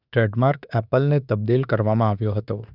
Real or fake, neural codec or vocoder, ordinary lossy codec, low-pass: fake; autoencoder, 48 kHz, 32 numbers a frame, DAC-VAE, trained on Japanese speech; none; 5.4 kHz